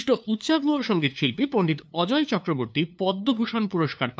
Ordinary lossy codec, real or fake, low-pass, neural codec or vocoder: none; fake; none; codec, 16 kHz, 2 kbps, FunCodec, trained on LibriTTS, 25 frames a second